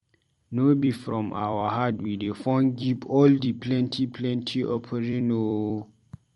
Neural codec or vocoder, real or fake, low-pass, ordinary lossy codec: vocoder, 44.1 kHz, 128 mel bands every 256 samples, BigVGAN v2; fake; 19.8 kHz; MP3, 48 kbps